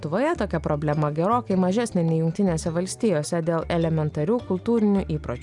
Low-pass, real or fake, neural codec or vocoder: 10.8 kHz; real; none